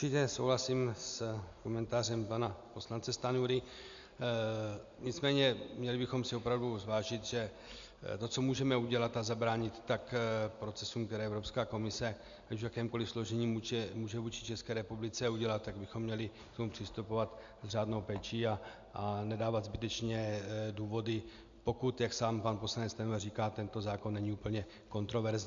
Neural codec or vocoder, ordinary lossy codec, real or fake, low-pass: none; MP3, 64 kbps; real; 7.2 kHz